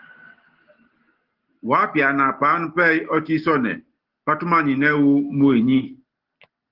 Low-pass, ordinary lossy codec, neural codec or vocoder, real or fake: 5.4 kHz; Opus, 16 kbps; none; real